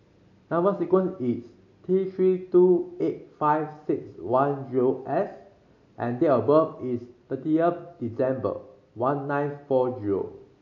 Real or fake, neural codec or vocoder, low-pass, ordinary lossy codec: real; none; 7.2 kHz; AAC, 48 kbps